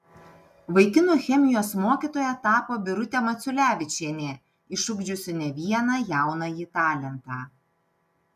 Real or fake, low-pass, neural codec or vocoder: real; 14.4 kHz; none